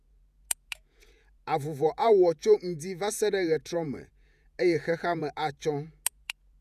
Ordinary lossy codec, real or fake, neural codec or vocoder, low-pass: none; fake; vocoder, 48 kHz, 128 mel bands, Vocos; 14.4 kHz